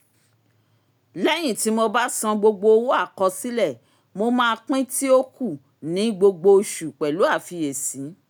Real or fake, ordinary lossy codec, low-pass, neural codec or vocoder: real; none; none; none